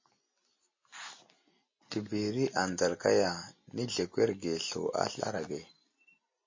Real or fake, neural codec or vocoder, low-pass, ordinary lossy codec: real; none; 7.2 kHz; MP3, 32 kbps